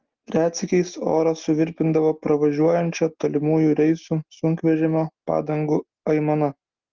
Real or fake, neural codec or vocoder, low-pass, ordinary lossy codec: real; none; 7.2 kHz; Opus, 16 kbps